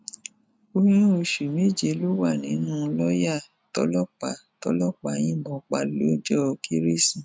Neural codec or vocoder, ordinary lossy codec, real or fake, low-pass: none; none; real; none